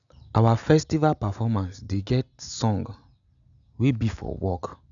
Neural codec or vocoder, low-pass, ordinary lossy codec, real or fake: none; 7.2 kHz; none; real